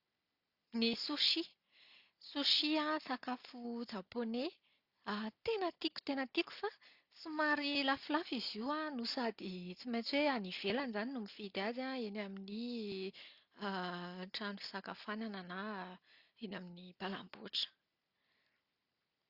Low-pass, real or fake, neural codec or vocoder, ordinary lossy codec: 5.4 kHz; real; none; Opus, 64 kbps